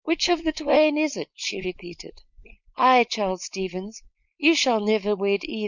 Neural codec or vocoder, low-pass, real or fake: codec, 16 kHz, 4.8 kbps, FACodec; 7.2 kHz; fake